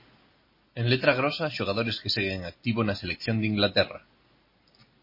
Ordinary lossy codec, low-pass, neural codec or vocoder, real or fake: MP3, 24 kbps; 5.4 kHz; none; real